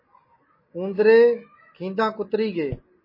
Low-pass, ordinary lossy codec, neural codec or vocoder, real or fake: 5.4 kHz; MP3, 24 kbps; none; real